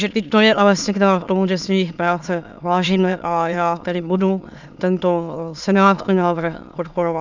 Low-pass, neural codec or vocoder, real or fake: 7.2 kHz; autoencoder, 22.05 kHz, a latent of 192 numbers a frame, VITS, trained on many speakers; fake